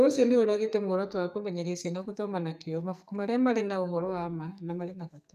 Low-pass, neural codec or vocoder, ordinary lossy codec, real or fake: 14.4 kHz; codec, 44.1 kHz, 2.6 kbps, SNAC; none; fake